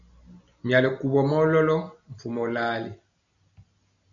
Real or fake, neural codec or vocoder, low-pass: real; none; 7.2 kHz